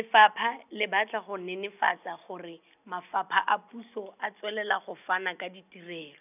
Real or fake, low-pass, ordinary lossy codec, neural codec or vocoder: real; 3.6 kHz; none; none